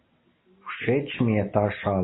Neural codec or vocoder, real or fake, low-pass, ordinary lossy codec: none; real; 7.2 kHz; AAC, 16 kbps